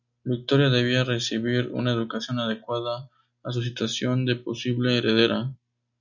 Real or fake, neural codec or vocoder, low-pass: real; none; 7.2 kHz